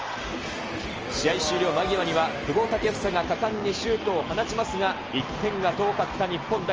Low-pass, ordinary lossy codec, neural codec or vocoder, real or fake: 7.2 kHz; Opus, 24 kbps; none; real